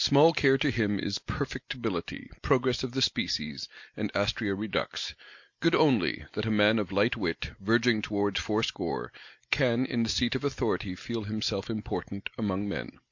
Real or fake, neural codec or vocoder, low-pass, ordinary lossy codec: real; none; 7.2 kHz; MP3, 48 kbps